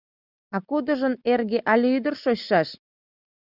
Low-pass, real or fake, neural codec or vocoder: 5.4 kHz; real; none